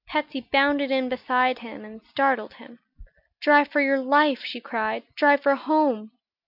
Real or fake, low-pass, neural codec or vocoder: real; 5.4 kHz; none